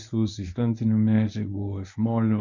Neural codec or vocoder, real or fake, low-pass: codec, 24 kHz, 0.9 kbps, WavTokenizer, medium speech release version 1; fake; 7.2 kHz